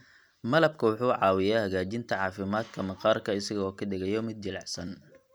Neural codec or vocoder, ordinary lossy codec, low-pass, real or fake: none; none; none; real